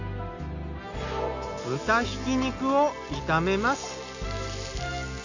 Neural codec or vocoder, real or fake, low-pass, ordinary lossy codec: none; real; 7.2 kHz; none